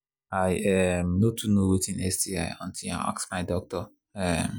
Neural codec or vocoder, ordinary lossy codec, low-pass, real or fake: none; none; none; real